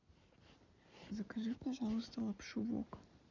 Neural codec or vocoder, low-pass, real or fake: none; 7.2 kHz; real